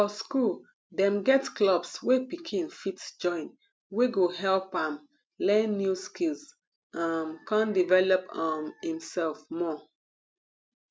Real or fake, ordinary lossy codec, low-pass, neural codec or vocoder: real; none; none; none